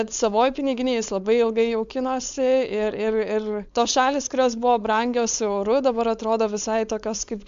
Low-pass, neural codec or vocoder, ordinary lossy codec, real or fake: 7.2 kHz; codec, 16 kHz, 4.8 kbps, FACodec; MP3, 64 kbps; fake